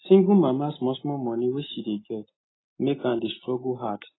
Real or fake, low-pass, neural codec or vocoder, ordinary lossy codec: real; 7.2 kHz; none; AAC, 16 kbps